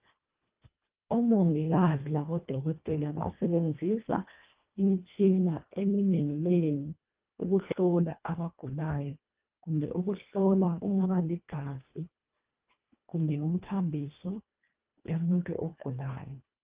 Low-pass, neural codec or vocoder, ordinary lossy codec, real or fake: 3.6 kHz; codec, 24 kHz, 1.5 kbps, HILCodec; Opus, 24 kbps; fake